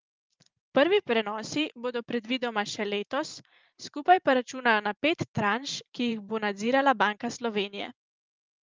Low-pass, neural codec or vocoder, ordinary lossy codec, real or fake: 7.2 kHz; vocoder, 44.1 kHz, 128 mel bands every 512 samples, BigVGAN v2; Opus, 24 kbps; fake